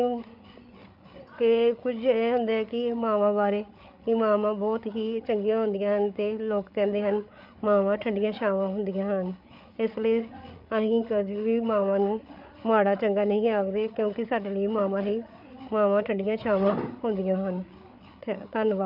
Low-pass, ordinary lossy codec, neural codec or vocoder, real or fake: 5.4 kHz; none; codec, 16 kHz, 8 kbps, FreqCodec, larger model; fake